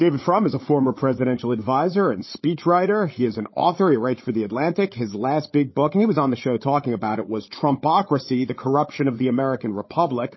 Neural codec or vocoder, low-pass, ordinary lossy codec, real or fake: codec, 16 kHz, 8 kbps, FreqCodec, larger model; 7.2 kHz; MP3, 24 kbps; fake